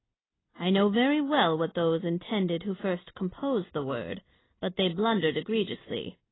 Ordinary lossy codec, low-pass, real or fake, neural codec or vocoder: AAC, 16 kbps; 7.2 kHz; real; none